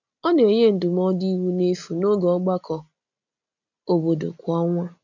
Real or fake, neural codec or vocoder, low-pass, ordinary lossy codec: real; none; 7.2 kHz; none